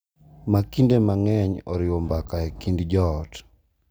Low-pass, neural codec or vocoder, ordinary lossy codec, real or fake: none; none; none; real